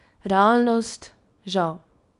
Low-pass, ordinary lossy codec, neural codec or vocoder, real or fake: 10.8 kHz; none; codec, 24 kHz, 0.9 kbps, WavTokenizer, small release; fake